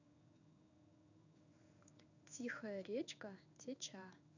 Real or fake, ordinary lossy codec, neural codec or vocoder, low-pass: fake; none; codec, 16 kHz in and 24 kHz out, 1 kbps, XY-Tokenizer; 7.2 kHz